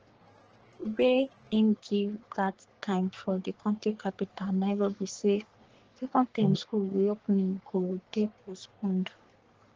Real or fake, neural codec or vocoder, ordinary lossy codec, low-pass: fake; codec, 44.1 kHz, 3.4 kbps, Pupu-Codec; Opus, 16 kbps; 7.2 kHz